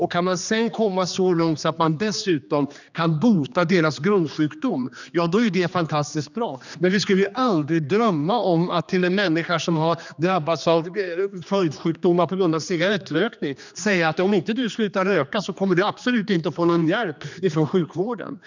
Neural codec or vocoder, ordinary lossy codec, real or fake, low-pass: codec, 16 kHz, 2 kbps, X-Codec, HuBERT features, trained on general audio; none; fake; 7.2 kHz